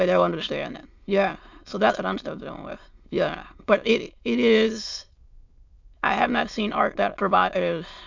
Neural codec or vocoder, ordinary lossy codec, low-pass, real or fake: autoencoder, 22.05 kHz, a latent of 192 numbers a frame, VITS, trained on many speakers; MP3, 64 kbps; 7.2 kHz; fake